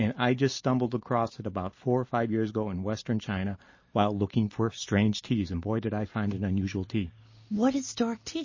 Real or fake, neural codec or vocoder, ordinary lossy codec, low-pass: real; none; MP3, 32 kbps; 7.2 kHz